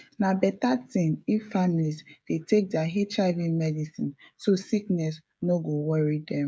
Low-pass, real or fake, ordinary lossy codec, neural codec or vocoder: none; fake; none; codec, 16 kHz, 16 kbps, FreqCodec, smaller model